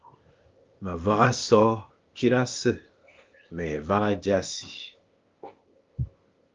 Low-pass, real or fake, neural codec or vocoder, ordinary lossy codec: 7.2 kHz; fake; codec, 16 kHz, 0.8 kbps, ZipCodec; Opus, 32 kbps